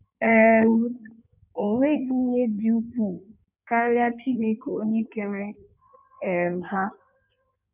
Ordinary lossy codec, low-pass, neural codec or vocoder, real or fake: none; 3.6 kHz; codec, 16 kHz in and 24 kHz out, 1.1 kbps, FireRedTTS-2 codec; fake